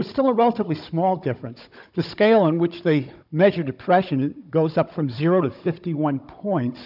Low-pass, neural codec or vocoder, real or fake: 5.4 kHz; codec, 16 kHz, 16 kbps, FunCodec, trained on Chinese and English, 50 frames a second; fake